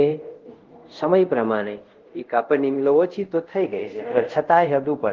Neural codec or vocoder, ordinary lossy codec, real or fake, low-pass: codec, 24 kHz, 0.5 kbps, DualCodec; Opus, 16 kbps; fake; 7.2 kHz